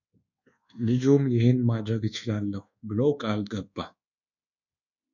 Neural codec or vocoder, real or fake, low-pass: codec, 24 kHz, 1.2 kbps, DualCodec; fake; 7.2 kHz